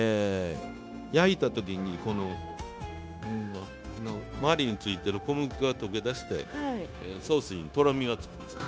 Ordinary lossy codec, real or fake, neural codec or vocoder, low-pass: none; fake; codec, 16 kHz, 0.9 kbps, LongCat-Audio-Codec; none